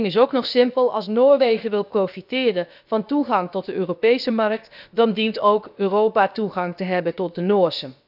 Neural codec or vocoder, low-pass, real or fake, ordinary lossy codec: codec, 16 kHz, about 1 kbps, DyCAST, with the encoder's durations; 5.4 kHz; fake; none